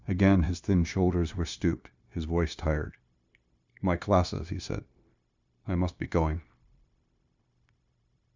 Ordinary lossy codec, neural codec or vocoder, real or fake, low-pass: Opus, 64 kbps; codec, 16 kHz, 0.9 kbps, LongCat-Audio-Codec; fake; 7.2 kHz